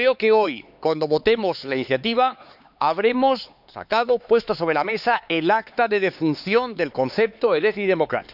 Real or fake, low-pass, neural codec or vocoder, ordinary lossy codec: fake; 5.4 kHz; codec, 16 kHz, 4 kbps, X-Codec, HuBERT features, trained on LibriSpeech; none